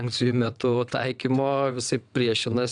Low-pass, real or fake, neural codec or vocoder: 9.9 kHz; fake; vocoder, 22.05 kHz, 80 mel bands, WaveNeXt